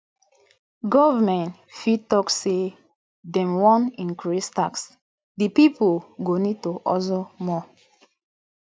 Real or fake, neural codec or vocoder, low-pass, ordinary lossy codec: real; none; none; none